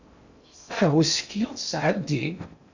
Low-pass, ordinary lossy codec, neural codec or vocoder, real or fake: 7.2 kHz; Opus, 64 kbps; codec, 16 kHz in and 24 kHz out, 0.6 kbps, FocalCodec, streaming, 2048 codes; fake